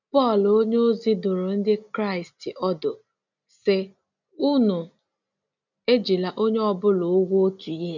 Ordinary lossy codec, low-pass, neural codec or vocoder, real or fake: none; 7.2 kHz; none; real